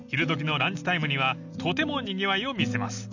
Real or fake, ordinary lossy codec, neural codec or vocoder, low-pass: real; none; none; 7.2 kHz